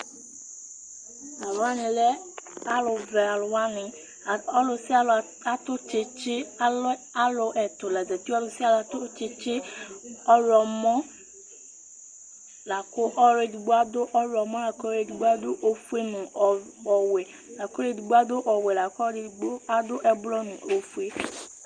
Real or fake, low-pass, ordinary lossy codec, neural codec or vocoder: real; 9.9 kHz; Opus, 32 kbps; none